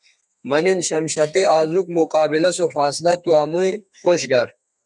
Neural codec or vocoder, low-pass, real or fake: codec, 32 kHz, 1.9 kbps, SNAC; 10.8 kHz; fake